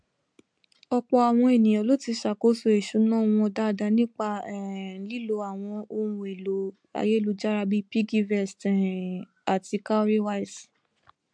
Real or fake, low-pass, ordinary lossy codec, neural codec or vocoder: real; 9.9 kHz; MP3, 64 kbps; none